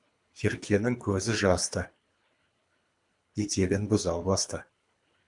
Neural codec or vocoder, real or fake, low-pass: codec, 24 kHz, 3 kbps, HILCodec; fake; 10.8 kHz